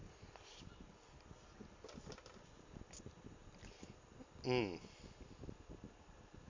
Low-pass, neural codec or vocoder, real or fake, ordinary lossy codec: 7.2 kHz; none; real; MP3, 48 kbps